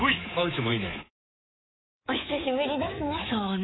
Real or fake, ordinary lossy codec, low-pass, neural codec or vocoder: fake; AAC, 16 kbps; 7.2 kHz; codec, 24 kHz, 3.1 kbps, DualCodec